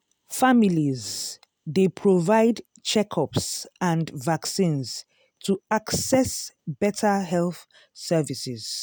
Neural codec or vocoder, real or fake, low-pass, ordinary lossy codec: none; real; none; none